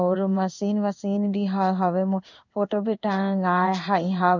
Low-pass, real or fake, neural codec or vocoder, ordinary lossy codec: 7.2 kHz; fake; codec, 16 kHz in and 24 kHz out, 1 kbps, XY-Tokenizer; none